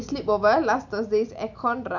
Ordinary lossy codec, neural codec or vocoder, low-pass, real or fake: none; none; 7.2 kHz; real